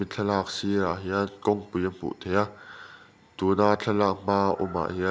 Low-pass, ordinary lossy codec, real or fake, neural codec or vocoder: none; none; real; none